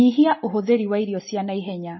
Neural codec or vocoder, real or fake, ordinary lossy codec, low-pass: none; real; MP3, 24 kbps; 7.2 kHz